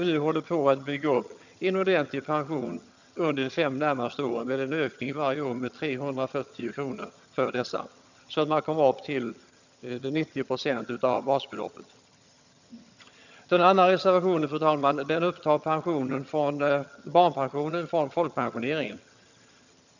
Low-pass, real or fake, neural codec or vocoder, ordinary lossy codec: 7.2 kHz; fake; vocoder, 22.05 kHz, 80 mel bands, HiFi-GAN; none